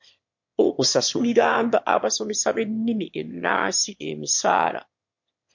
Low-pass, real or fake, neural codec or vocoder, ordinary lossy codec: 7.2 kHz; fake; autoencoder, 22.05 kHz, a latent of 192 numbers a frame, VITS, trained on one speaker; MP3, 48 kbps